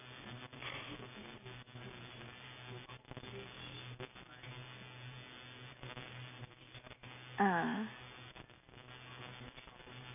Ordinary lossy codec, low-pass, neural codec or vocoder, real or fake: none; 3.6 kHz; none; real